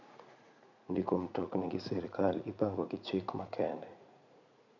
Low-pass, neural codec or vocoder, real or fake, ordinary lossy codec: 7.2 kHz; vocoder, 44.1 kHz, 80 mel bands, Vocos; fake; none